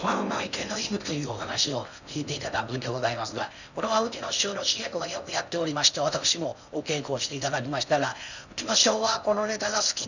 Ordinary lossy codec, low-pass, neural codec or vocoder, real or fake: none; 7.2 kHz; codec, 16 kHz in and 24 kHz out, 0.6 kbps, FocalCodec, streaming, 4096 codes; fake